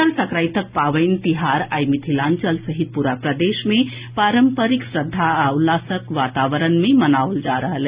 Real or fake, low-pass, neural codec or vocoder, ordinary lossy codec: real; 3.6 kHz; none; Opus, 64 kbps